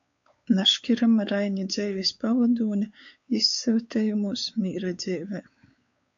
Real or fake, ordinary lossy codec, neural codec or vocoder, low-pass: fake; AAC, 64 kbps; codec, 16 kHz, 4 kbps, X-Codec, WavLM features, trained on Multilingual LibriSpeech; 7.2 kHz